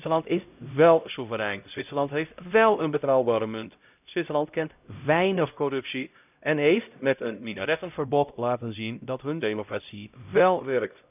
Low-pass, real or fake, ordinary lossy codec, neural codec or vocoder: 3.6 kHz; fake; none; codec, 16 kHz, 0.5 kbps, X-Codec, HuBERT features, trained on LibriSpeech